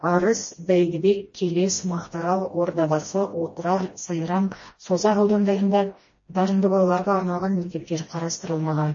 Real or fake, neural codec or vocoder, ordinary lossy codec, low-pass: fake; codec, 16 kHz, 1 kbps, FreqCodec, smaller model; MP3, 32 kbps; 7.2 kHz